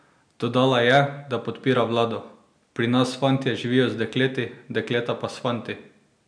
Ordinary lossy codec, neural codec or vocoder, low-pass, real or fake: none; none; 9.9 kHz; real